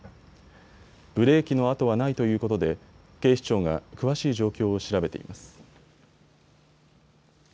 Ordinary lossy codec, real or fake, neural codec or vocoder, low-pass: none; real; none; none